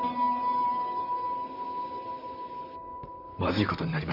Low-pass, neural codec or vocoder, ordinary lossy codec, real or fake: 5.4 kHz; vocoder, 44.1 kHz, 128 mel bands, Pupu-Vocoder; none; fake